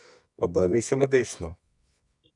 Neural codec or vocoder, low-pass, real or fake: codec, 24 kHz, 0.9 kbps, WavTokenizer, medium music audio release; 10.8 kHz; fake